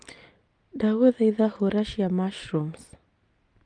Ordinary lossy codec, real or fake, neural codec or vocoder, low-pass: Opus, 32 kbps; real; none; 9.9 kHz